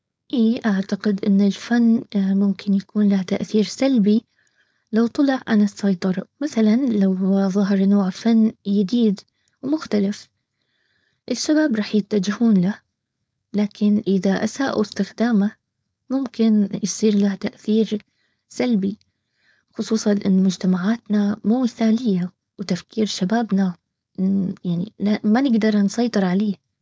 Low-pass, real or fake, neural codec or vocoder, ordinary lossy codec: none; fake; codec, 16 kHz, 4.8 kbps, FACodec; none